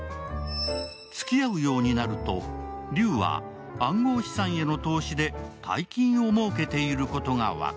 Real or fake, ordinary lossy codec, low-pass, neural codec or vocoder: real; none; none; none